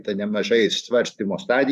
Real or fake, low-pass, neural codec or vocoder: fake; 14.4 kHz; vocoder, 44.1 kHz, 128 mel bands every 512 samples, BigVGAN v2